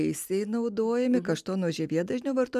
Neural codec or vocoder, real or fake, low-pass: none; real; 14.4 kHz